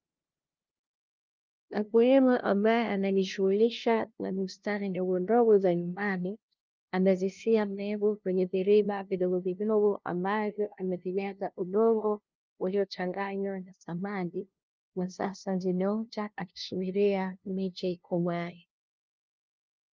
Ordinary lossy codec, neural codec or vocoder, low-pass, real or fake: Opus, 24 kbps; codec, 16 kHz, 0.5 kbps, FunCodec, trained on LibriTTS, 25 frames a second; 7.2 kHz; fake